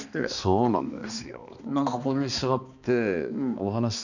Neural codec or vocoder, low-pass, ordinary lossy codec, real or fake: codec, 16 kHz, 2 kbps, X-Codec, HuBERT features, trained on balanced general audio; 7.2 kHz; none; fake